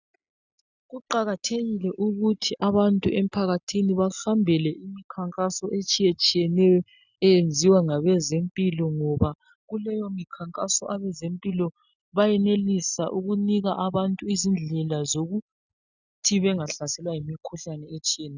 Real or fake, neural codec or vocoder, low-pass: real; none; 7.2 kHz